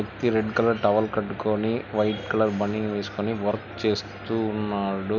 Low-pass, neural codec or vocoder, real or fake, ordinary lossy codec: 7.2 kHz; none; real; none